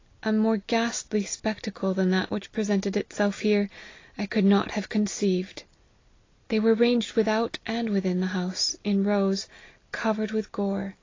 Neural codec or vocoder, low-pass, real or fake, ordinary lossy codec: none; 7.2 kHz; real; AAC, 32 kbps